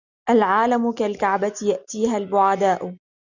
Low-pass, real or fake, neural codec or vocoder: 7.2 kHz; real; none